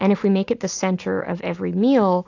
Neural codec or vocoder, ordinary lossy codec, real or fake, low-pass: none; AAC, 48 kbps; real; 7.2 kHz